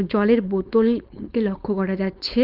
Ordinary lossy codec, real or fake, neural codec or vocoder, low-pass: Opus, 24 kbps; fake; codec, 16 kHz, 4.8 kbps, FACodec; 5.4 kHz